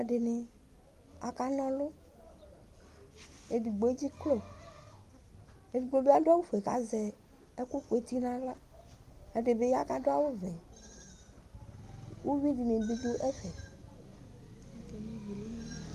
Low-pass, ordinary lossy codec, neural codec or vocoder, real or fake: 14.4 kHz; Opus, 32 kbps; none; real